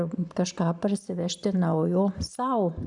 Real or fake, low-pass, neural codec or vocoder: fake; 10.8 kHz; vocoder, 44.1 kHz, 128 mel bands every 512 samples, BigVGAN v2